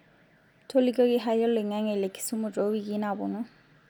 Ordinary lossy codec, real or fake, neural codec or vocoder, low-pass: none; real; none; 19.8 kHz